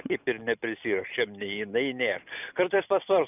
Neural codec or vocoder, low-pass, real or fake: none; 3.6 kHz; real